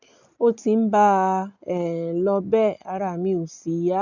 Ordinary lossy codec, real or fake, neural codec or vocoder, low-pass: none; real; none; 7.2 kHz